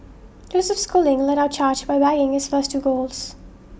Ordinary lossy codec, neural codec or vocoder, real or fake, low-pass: none; none; real; none